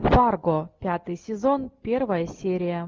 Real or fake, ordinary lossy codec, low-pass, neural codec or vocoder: real; Opus, 32 kbps; 7.2 kHz; none